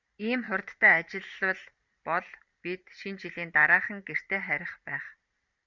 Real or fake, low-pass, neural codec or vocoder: fake; 7.2 kHz; vocoder, 44.1 kHz, 128 mel bands every 512 samples, BigVGAN v2